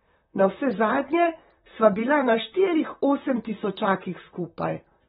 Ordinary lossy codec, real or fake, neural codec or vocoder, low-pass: AAC, 16 kbps; fake; vocoder, 44.1 kHz, 128 mel bands, Pupu-Vocoder; 19.8 kHz